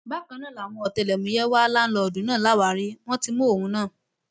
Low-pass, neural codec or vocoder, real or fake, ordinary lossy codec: none; none; real; none